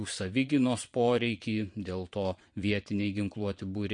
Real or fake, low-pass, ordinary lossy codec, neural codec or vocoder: fake; 9.9 kHz; MP3, 48 kbps; vocoder, 22.05 kHz, 80 mel bands, Vocos